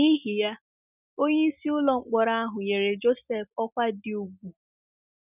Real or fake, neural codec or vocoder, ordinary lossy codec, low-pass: real; none; none; 3.6 kHz